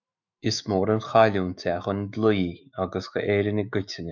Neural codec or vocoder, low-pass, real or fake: autoencoder, 48 kHz, 128 numbers a frame, DAC-VAE, trained on Japanese speech; 7.2 kHz; fake